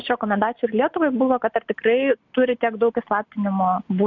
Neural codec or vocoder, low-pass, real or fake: none; 7.2 kHz; real